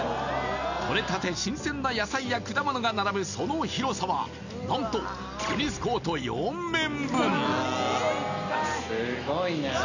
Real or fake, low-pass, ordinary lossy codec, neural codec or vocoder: real; 7.2 kHz; none; none